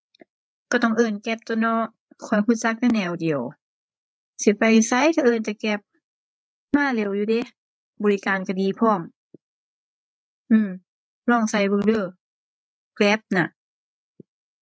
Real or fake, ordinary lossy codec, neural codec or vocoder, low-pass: fake; none; codec, 16 kHz, 8 kbps, FreqCodec, larger model; none